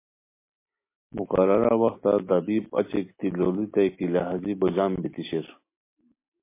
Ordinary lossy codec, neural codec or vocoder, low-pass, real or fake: MP3, 24 kbps; none; 3.6 kHz; real